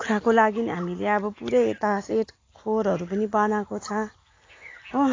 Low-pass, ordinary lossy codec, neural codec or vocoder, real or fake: 7.2 kHz; AAC, 32 kbps; none; real